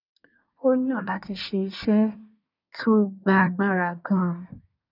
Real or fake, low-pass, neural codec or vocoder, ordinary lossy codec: fake; 5.4 kHz; codec, 24 kHz, 1 kbps, SNAC; none